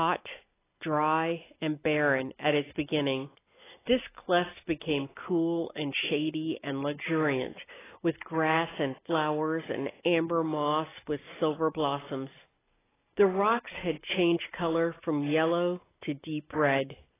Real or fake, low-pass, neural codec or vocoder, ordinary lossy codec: real; 3.6 kHz; none; AAC, 16 kbps